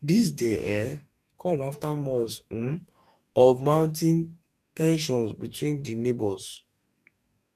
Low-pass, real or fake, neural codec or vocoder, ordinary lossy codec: 14.4 kHz; fake; codec, 44.1 kHz, 2.6 kbps, DAC; none